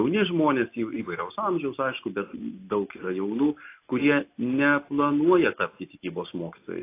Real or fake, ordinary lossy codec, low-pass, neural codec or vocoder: real; AAC, 24 kbps; 3.6 kHz; none